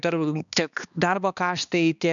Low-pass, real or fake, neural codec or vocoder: 7.2 kHz; fake; codec, 16 kHz, 2 kbps, X-Codec, HuBERT features, trained on balanced general audio